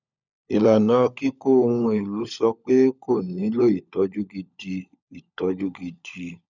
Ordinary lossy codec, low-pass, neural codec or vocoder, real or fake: none; 7.2 kHz; codec, 16 kHz, 16 kbps, FunCodec, trained on LibriTTS, 50 frames a second; fake